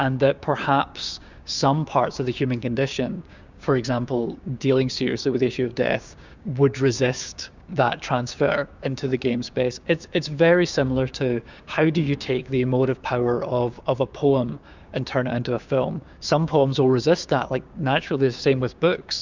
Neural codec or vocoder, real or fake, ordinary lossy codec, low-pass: codec, 16 kHz, 6 kbps, DAC; fake; Opus, 64 kbps; 7.2 kHz